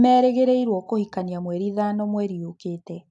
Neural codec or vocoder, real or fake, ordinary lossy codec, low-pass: none; real; AAC, 64 kbps; 10.8 kHz